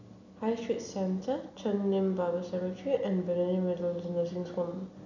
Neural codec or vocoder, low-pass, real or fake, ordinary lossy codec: none; 7.2 kHz; real; none